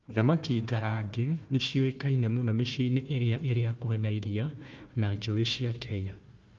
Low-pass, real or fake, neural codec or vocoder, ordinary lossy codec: 7.2 kHz; fake; codec, 16 kHz, 1 kbps, FunCodec, trained on Chinese and English, 50 frames a second; Opus, 32 kbps